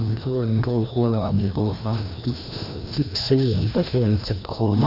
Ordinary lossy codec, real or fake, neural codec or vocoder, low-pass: none; fake; codec, 16 kHz, 1 kbps, FreqCodec, larger model; 5.4 kHz